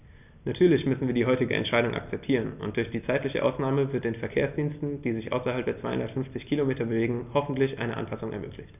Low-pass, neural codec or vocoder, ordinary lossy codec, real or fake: 3.6 kHz; none; none; real